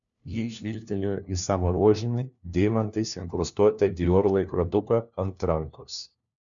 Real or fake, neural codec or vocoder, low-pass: fake; codec, 16 kHz, 1 kbps, FunCodec, trained on LibriTTS, 50 frames a second; 7.2 kHz